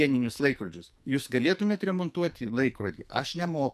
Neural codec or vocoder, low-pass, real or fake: codec, 44.1 kHz, 2.6 kbps, SNAC; 14.4 kHz; fake